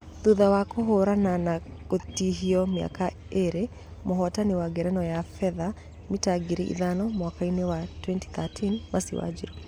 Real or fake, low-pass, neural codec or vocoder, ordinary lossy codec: real; 19.8 kHz; none; none